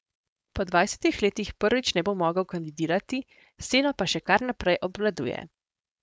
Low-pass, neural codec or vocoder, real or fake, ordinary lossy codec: none; codec, 16 kHz, 4.8 kbps, FACodec; fake; none